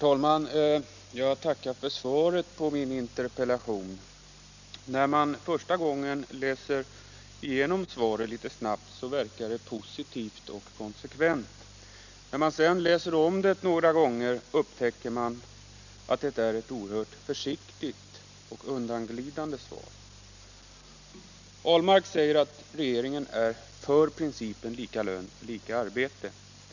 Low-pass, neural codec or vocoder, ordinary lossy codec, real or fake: 7.2 kHz; none; none; real